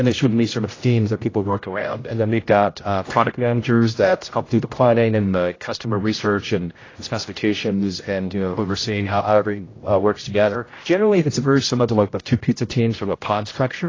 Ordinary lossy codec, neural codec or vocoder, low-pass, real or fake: AAC, 32 kbps; codec, 16 kHz, 0.5 kbps, X-Codec, HuBERT features, trained on general audio; 7.2 kHz; fake